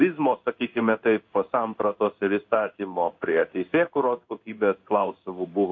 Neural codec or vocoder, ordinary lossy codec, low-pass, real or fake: codec, 16 kHz in and 24 kHz out, 1 kbps, XY-Tokenizer; MP3, 48 kbps; 7.2 kHz; fake